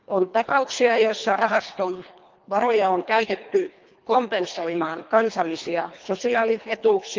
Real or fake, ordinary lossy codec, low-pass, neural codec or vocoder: fake; Opus, 32 kbps; 7.2 kHz; codec, 24 kHz, 1.5 kbps, HILCodec